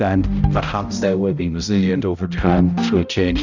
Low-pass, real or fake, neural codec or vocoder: 7.2 kHz; fake; codec, 16 kHz, 0.5 kbps, X-Codec, HuBERT features, trained on balanced general audio